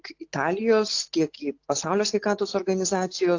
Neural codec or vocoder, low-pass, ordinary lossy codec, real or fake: none; 7.2 kHz; AAC, 48 kbps; real